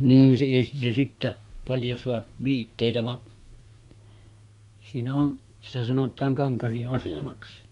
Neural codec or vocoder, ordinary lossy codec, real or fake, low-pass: codec, 24 kHz, 1 kbps, SNAC; none; fake; 10.8 kHz